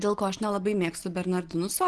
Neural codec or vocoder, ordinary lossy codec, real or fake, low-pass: none; Opus, 16 kbps; real; 10.8 kHz